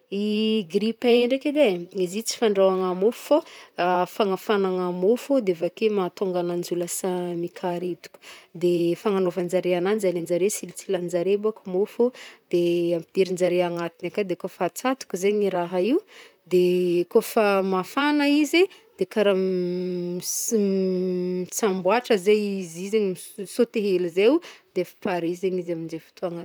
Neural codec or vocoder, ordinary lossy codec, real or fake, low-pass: vocoder, 44.1 kHz, 128 mel bands, Pupu-Vocoder; none; fake; none